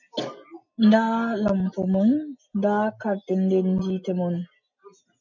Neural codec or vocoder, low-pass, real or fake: none; 7.2 kHz; real